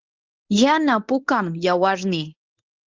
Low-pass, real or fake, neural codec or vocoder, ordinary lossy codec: 7.2 kHz; fake; codec, 24 kHz, 0.9 kbps, WavTokenizer, medium speech release version 1; Opus, 32 kbps